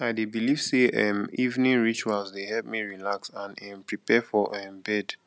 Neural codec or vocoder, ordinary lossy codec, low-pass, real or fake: none; none; none; real